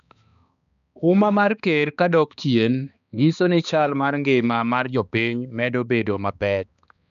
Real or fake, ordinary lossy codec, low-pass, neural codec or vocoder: fake; none; 7.2 kHz; codec, 16 kHz, 2 kbps, X-Codec, HuBERT features, trained on balanced general audio